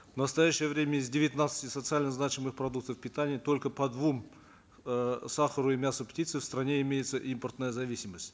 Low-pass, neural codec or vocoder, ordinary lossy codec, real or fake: none; none; none; real